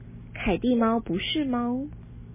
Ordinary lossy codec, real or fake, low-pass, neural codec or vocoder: MP3, 16 kbps; real; 3.6 kHz; none